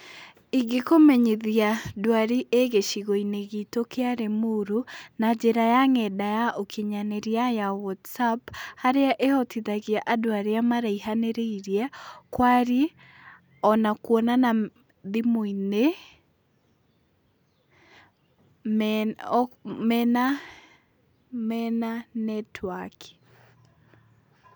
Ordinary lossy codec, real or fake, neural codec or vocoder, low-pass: none; real; none; none